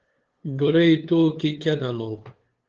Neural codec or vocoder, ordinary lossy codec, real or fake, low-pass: codec, 16 kHz, 2 kbps, FunCodec, trained on LibriTTS, 25 frames a second; Opus, 16 kbps; fake; 7.2 kHz